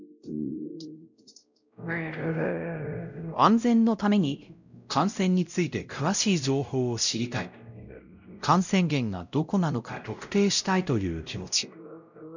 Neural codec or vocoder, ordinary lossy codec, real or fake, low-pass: codec, 16 kHz, 0.5 kbps, X-Codec, WavLM features, trained on Multilingual LibriSpeech; none; fake; 7.2 kHz